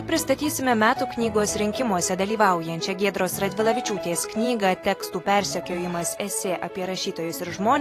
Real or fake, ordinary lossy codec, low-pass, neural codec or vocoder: fake; AAC, 48 kbps; 14.4 kHz; vocoder, 44.1 kHz, 128 mel bands every 512 samples, BigVGAN v2